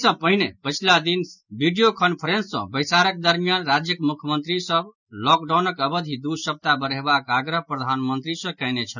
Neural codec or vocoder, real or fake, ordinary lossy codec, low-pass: none; real; none; 7.2 kHz